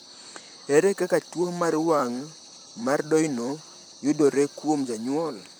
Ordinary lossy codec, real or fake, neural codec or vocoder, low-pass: none; fake; vocoder, 44.1 kHz, 128 mel bands, Pupu-Vocoder; none